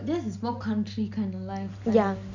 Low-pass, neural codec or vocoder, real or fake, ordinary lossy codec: 7.2 kHz; none; real; none